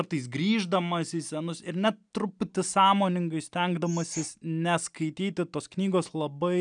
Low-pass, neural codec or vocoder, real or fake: 9.9 kHz; none; real